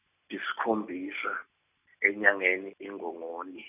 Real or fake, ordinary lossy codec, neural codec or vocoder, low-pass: real; none; none; 3.6 kHz